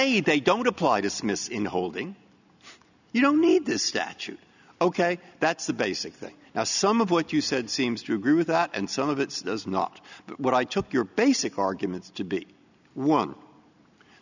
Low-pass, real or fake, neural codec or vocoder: 7.2 kHz; real; none